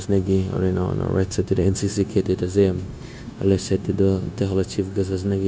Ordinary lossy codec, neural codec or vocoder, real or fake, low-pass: none; codec, 16 kHz, 0.9 kbps, LongCat-Audio-Codec; fake; none